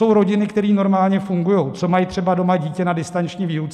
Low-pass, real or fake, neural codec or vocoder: 14.4 kHz; real; none